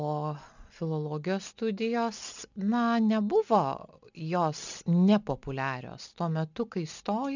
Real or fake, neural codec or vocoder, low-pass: real; none; 7.2 kHz